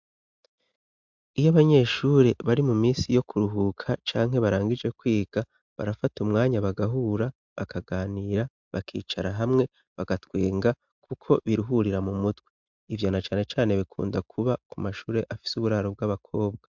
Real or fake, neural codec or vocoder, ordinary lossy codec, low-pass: real; none; MP3, 64 kbps; 7.2 kHz